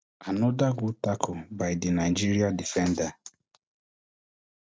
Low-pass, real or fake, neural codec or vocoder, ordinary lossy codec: none; real; none; none